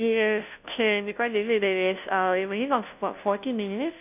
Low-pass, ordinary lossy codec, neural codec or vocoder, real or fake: 3.6 kHz; none; codec, 16 kHz, 0.5 kbps, FunCodec, trained on Chinese and English, 25 frames a second; fake